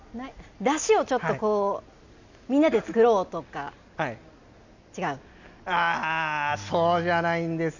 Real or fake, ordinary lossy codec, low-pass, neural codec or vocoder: real; none; 7.2 kHz; none